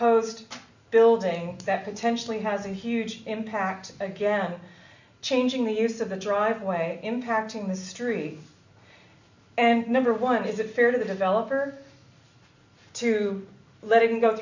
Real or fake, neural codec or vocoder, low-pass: real; none; 7.2 kHz